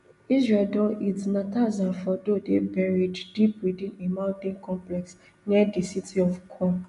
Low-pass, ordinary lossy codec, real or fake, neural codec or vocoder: 10.8 kHz; none; real; none